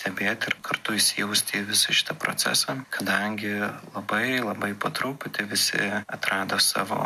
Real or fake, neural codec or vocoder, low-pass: real; none; 14.4 kHz